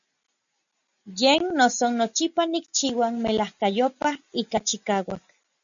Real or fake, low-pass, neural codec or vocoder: real; 7.2 kHz; none